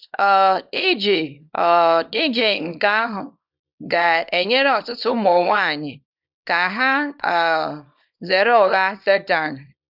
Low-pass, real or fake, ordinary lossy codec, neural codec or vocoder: 5.4 kHz; fake; none; codec, 24 kHz, 0.9 kbps, WavTokenizer, small release